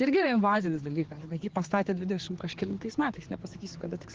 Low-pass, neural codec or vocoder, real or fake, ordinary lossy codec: 7.2 kHz; codec, 16 kHz, 4 kbps, X-Codec, HuBERT features, trained on general audio; fake; Opus, 16 kbps